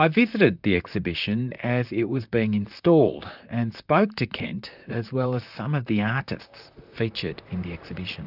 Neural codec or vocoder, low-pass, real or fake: vocoder, 44.1 kHz, 128 mel bands, Pupu-Vocoder; 5.4 kHz; fake